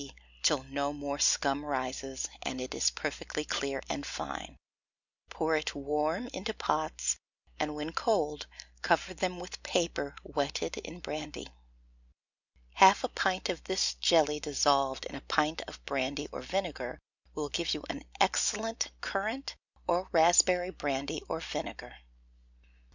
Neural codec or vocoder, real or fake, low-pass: none; real; 7.2 kHz